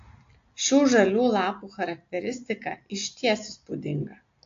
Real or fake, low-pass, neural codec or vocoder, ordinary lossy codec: real; 7.2 kHz; none; AAC, 48 kbps